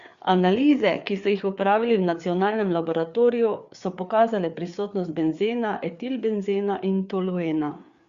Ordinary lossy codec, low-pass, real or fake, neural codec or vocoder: Opus, 64 kbps; 7.2 kHz; fake; codec, 16 kHz, 4 kbps, FreqCodec, larger model